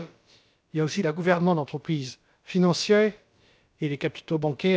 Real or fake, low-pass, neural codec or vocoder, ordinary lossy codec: fake; none; codec, 16 kHz, about 1 kbps, DyCAST, with the encoder's durations; none